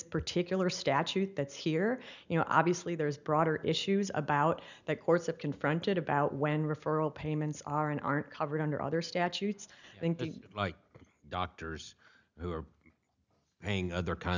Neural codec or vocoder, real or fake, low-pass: none; real; 7.2 kHz